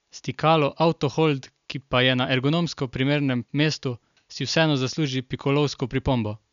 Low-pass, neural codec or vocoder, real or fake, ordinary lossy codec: 7.2 kHz; none; real; none